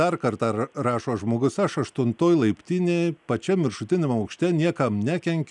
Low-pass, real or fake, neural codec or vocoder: 10.8 kHz; real; none